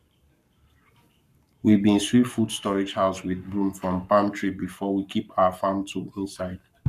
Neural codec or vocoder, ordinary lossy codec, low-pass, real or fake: codec, 44.1 kHz, 7.8 kbps, Pupu-Codec; none; 14.4 kHz; fake